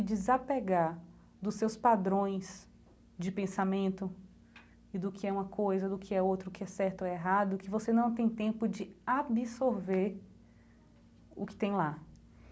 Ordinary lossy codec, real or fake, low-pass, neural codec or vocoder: none; real; none; none